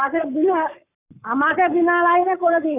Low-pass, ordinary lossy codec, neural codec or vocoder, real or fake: 3.6 kHz; none; codec, 44.1 kHz, 7.8 kbps, Pupu-Codec; fake